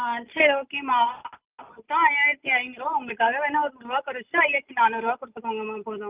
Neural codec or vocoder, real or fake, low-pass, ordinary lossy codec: none; real; 3.6 kHz; Opus, 24 kbps